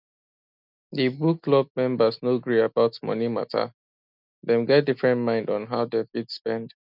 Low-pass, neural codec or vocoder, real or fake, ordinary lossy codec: 5.4 kHz; none; real; none